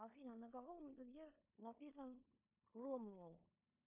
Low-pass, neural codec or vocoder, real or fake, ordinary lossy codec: 3.6 kHz; codec, 16 kHz in and 24 kHz out, 0.9 kbps, LongCat-Audio-Codec, four codebook decoder; fake; MP3, 32 kbps